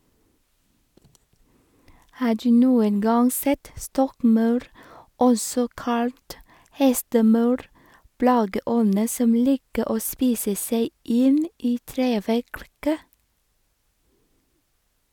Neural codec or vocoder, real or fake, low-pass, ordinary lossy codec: none; real; 19.8 kHz; none